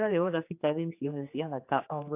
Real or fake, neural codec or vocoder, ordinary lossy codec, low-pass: fake; codec, 16 kHz, 2 kbps, X-Codec, HuBERT features, trained on general audio; AAC, 32 kbps; 3.6 kHz